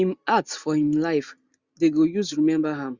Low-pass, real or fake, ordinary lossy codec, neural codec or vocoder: 7.2 kHz; real; Opus, 64 kbps; none